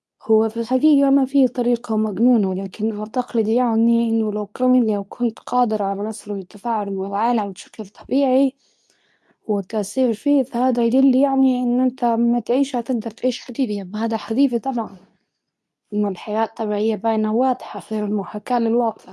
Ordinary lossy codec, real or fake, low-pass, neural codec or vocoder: none; fake; none; codec, 24 kHz, 0.9 kbps, WavTokenizer, medium speech release version 2